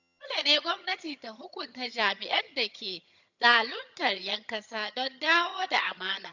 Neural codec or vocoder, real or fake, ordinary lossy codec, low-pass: vocoder, 22.05 kHz, 80 mel bands, HiFi-GAN; fake; none; 7.2 kHz